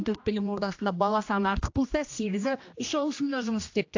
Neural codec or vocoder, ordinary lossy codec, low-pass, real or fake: codec, 16 kHz, 1 kbps, X-Codec, HuBERT features, trained on general audio; AAC, 48 kbps; 7.2 kHz; fake